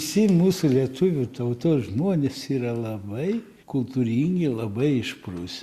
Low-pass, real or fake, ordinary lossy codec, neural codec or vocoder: 14.4 kHz; real; Opus, 64 kbps; none